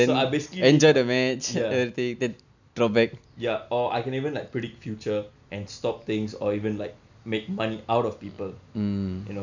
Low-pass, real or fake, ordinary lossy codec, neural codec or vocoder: 7.2 kHz; real; none; none